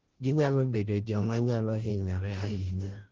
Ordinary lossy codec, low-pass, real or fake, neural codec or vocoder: Opus, 16 kbps; 7.2 kHz; fake; codec, 16 kHz, 0.5 kbps, FunCodec, trained on Chinese and English, 25 frames a second